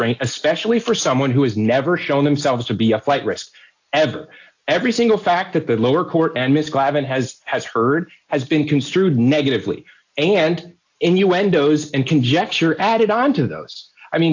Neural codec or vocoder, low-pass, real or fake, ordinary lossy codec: none; 7.2 kHz; real; AAC, 48 kbps